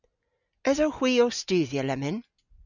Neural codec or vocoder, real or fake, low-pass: none; real; 7.2 kHz